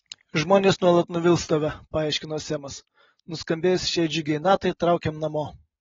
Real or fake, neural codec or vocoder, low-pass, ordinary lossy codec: real; none; 7.2 kHz; AAC, 24 kbps